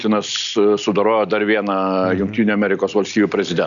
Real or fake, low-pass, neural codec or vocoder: real; 7.2 kHz; none